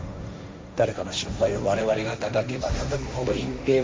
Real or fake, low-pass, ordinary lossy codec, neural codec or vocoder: fake; none; none; codec, 16 kHz, 1.1 kbps, Voila-Tokenizer